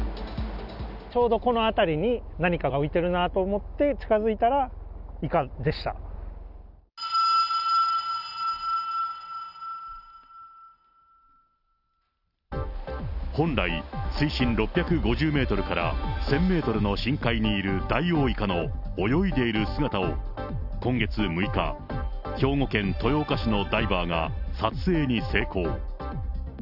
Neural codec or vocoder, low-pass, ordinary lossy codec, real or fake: none; 5.4 kHz; none; real